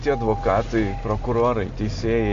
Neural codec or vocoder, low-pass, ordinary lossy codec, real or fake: none; 7.2 kHz; MP3, 48 kbps; real